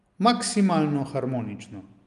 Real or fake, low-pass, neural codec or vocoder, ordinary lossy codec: real; 10.8 kHz; none; Opus, 32 kbps